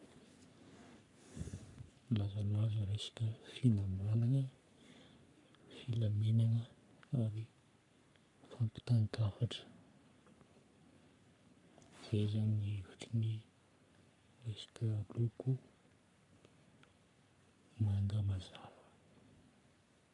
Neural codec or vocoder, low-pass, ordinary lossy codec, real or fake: codec, 44.1 kHz, 3.4 kbps, Pupu-Codec; 10.8 kHz; none; fake